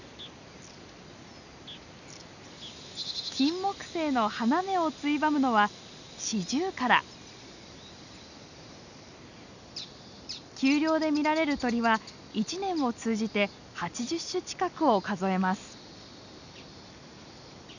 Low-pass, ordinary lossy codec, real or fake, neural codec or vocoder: 7.2 kHz; none; real; none